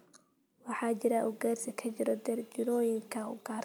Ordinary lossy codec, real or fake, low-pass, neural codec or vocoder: none; real; none; none